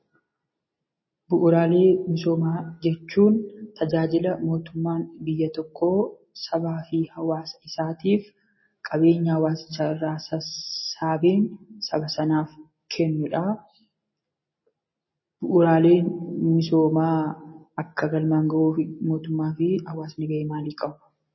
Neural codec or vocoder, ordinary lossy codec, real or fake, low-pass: none; MP3, 24 kbps; real; 7.2 kHz